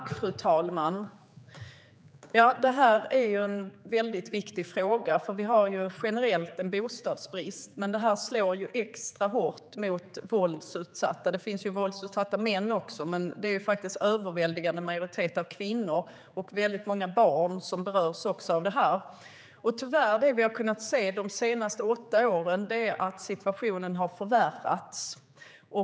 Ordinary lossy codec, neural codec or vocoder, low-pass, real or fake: none; codec, 16 kHz, 4 kbps, X-Codec, HuBERT features, trained on general audio; none; fake